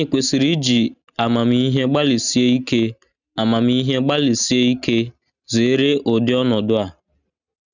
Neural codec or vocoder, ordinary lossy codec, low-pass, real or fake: none; none; 7.2 kHz; real